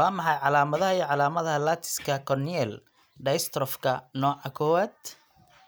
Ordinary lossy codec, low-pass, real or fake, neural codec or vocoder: none; none; real; none